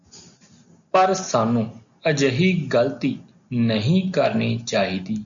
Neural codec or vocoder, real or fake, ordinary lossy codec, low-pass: none; real; MP3, 96 kbps; 7.2 kHz